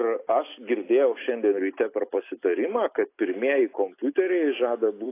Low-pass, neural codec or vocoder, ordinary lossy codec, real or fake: 3.6 kHz; none; AAC, 24 kbps; real